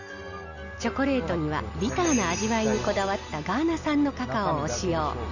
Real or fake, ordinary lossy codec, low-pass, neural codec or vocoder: real; none; 7.2 kHz; none